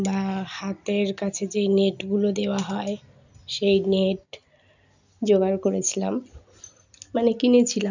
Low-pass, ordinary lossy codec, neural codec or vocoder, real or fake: 7.2 kHz; none; none; real